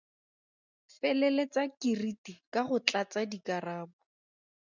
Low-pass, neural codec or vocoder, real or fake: 7.2 kHz; none; real